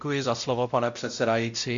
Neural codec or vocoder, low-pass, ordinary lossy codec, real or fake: codec, 16 kHz, 0.5 kbps, X-Codec, WavLM features, trained on Multilingual LibriSpeech; 7.2 kHz; MP3, 64 kbps; fake